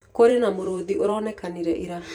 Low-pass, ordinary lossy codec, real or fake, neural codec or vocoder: 19.8 kHz; none; fake; vocoder, 44.1 kHz, 128 mel bands every 512 samples, BigVGAN v2